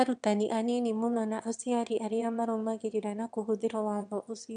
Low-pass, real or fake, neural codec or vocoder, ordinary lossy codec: 9.9 kHz; fake; autoencoder, 22.05 kHz, a latent of 192 numbers a frame, VITS, trained on one speaker; none